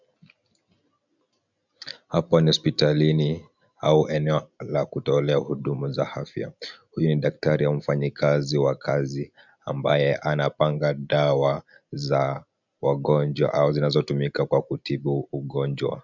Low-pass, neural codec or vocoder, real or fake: 7.2 kHz; none; real